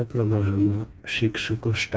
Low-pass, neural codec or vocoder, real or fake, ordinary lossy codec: none; codec, 16 kHz, 2 kbps, FreqCodec, smaller model; fake; none